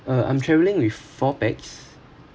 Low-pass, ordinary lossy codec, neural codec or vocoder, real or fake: none; none; none; real